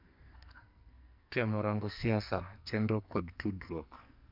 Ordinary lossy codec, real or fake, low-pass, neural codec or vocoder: MP3, 48 kbps; fake; 5.4 kHz; codec, 44.1 kHz, 2.6 kbps, SNAC